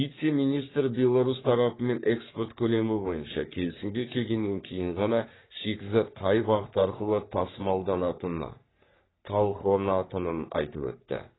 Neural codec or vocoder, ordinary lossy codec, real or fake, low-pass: codec, 44.1 kHz, 3.4 kbps, Pupu-Codec; AAC, 16 kbps; fake; 7.2 kHz